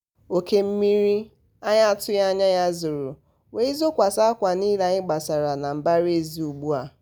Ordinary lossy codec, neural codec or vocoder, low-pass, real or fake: none; none; none; real